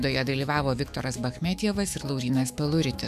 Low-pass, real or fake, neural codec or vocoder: 14.4 kHz; fake; autoencoder, 48 kHz, 128 numbers a frame, DAC-VAE, trained on Japanese speech